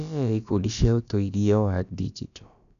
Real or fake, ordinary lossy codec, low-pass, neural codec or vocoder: fake; none; 7.2 kHz; codec, 16 kHz, about 1 kbps, DyCAST, with the encoder's durations